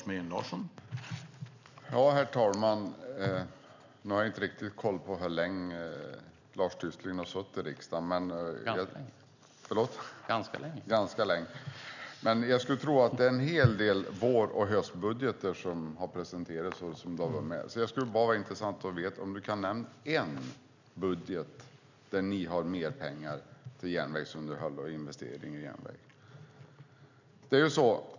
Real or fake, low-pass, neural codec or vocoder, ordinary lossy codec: real; 7.2 kHz; none; none